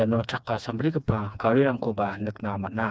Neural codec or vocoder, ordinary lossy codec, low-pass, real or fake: codec, 16 kHz, 2 kbps, FreqCodec, smaller model; none; none; fake